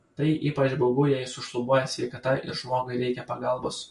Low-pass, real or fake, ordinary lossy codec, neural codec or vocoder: 14.4 kHz; real; MP3, 48 kbps; none